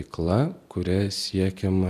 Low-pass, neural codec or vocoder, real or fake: 14.4 kHz; vocoder, 44.1 kHz, 128 mel bands every 256 samples, BigVGAN v2; fake